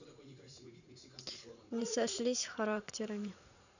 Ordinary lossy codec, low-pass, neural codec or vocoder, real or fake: none; 7.2 kHz; vocoder, 44.1 kHz, 80 mel bands, Vocos; fake